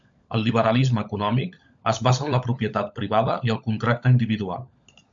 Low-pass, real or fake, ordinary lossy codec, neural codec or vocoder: 7.2 kHz; fake; MP3, 64 kbps; codec, 16 kHz, 16 kbps, FunCodec, trained on LibriTTS, 50 frames a second